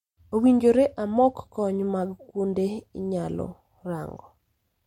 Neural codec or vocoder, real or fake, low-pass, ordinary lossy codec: none; real; 19.8 kHz; MP3, 64 kbps